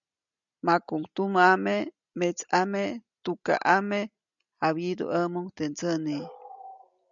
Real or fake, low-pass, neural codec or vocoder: real; 7.2 kHz; none